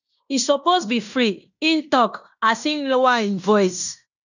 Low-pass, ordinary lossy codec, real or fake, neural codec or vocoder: 7.2 kHz; none; fake; codec, 16 kHz in and 24 kHz out, 0.9 kbps, LongCat-Audio-Codec, fine tuned four codebook decoder